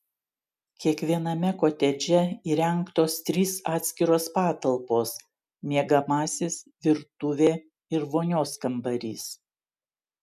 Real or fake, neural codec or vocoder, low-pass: real; none; 14.4 kHz